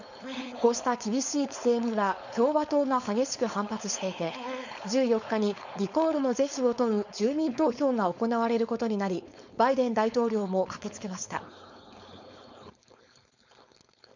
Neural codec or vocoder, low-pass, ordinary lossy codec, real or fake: codec, 16 kHz, 4.8 kbps, FACodec; 7.2 kHz; none; fake